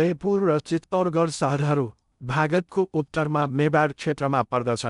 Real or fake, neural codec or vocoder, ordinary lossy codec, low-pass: fake; codec, 16 kHz in and 24 kHz out, 0.6 kbps, FocalCodec, streaming, 2048 codes; none; 10.8 kHz